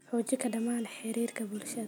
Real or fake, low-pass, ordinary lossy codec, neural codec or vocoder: real; none; none; none